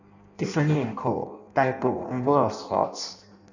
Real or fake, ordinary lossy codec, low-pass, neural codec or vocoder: fake; none; 7.2 kHz; codec, 16 kHz in and 24 kHz out, 0.6 kbps, FireRedTTS-2 codec